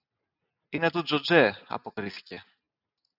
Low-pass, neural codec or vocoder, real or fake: 5.4 kHz; none; real